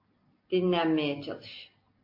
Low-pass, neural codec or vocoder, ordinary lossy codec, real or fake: 5.4 kHz; none; MP3, 32 kbps; real